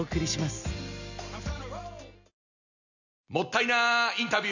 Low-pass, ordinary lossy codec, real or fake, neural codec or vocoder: 7.2 kHz; none; real; none